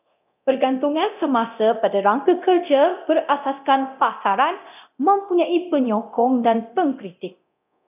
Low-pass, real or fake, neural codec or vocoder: 3.6 kHz; fake; codec, 24 kHz, 0.9 kbps, DualCodec